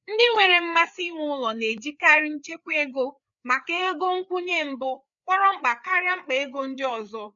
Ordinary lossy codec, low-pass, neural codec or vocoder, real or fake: none; 7.2 kHz; codec, 16 kHz, 4 kbps, FreqCodec, larger model; fake